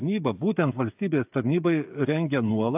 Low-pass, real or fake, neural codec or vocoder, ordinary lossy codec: 3.6 kHz; fake; codec, 16 kHz, 8 kbps, FreqCodec, smaller model; AAC, 32 kbps